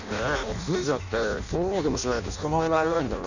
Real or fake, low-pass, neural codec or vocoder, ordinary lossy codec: fake; 7.2 kHz; codec, 16 kHz in and 24 kHz out, 0.6 kbps, FireRedTTS-2 codec; none